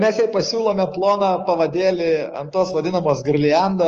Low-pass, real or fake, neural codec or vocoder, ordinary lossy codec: 7.2 kHz; fake; codec, 16 kHz, 6 kbps, DAC; Opus, 24 kbps